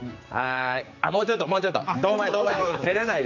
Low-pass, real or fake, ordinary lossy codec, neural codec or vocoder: 7.2 kHz; fake; AAC, 48 kbps; codec, 16 kHz, 4 kbps, X-Codec, HuBERT features, trained on general audio